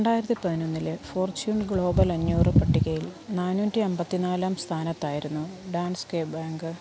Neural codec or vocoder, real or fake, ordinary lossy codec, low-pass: none; real; none; none